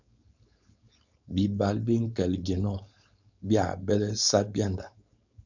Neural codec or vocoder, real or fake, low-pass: codec, 16 kHz, 4.8 kbps, FACodec; fake; 7.2 kHz